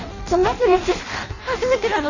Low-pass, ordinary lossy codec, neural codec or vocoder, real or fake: 7.2 kHz; none; codec, 16 kHz in and 24 kHz out, 0.6 kbps, FireRedTTS-2 codec; fake